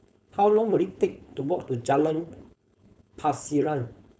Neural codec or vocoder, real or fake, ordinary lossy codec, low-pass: codec, 16 kHz, 4.8 kbps, FACodec; fake; none; none